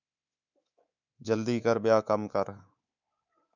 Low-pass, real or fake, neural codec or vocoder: 7.2 kHz; fake; codec, 24 kHz, 3.1 kbps, DualCodec